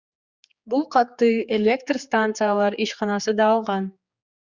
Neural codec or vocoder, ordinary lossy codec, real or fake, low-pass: codec, 16 kHz, 4 kbps, X-Codec, HuBERT features, trained on general audio; Opus, 64 kbps; fake; 7.2 kHz